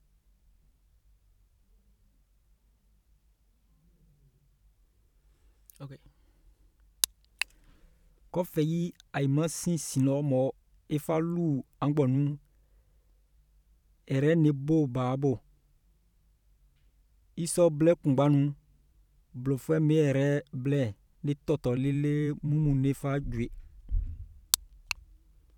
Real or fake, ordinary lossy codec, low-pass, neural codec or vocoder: fake; none; 19.8 kHz; vocoder, 44.1 kHz, 128 mel bands every 512 samples, BigVGAN v2